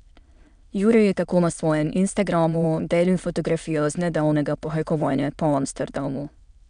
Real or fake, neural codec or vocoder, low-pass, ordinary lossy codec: fake; autoencoder, 22.05 kHz, a latent of 192 numbers a frame, VITS, trained on many speakers; 9.9 kHz; none